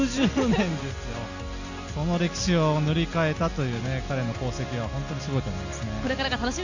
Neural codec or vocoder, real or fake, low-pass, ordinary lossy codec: none; real; 7.2 kHz; none